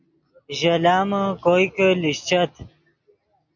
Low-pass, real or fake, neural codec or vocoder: 7.2 kHz; real; none